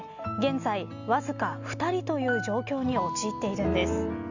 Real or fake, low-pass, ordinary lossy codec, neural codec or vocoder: real; 7.2 kHz; none; none